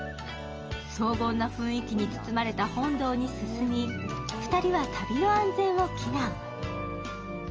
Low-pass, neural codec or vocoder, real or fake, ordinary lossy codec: 7.2 kHz; none; real; Opus, 24 kbps